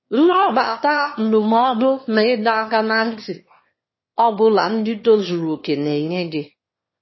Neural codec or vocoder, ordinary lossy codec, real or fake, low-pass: autoencoder, 22.05 kHz, a latent of 192 numbers a frame, VITS, trained on one speaker; MP3, 24 kbps; fake; 7.2 kHz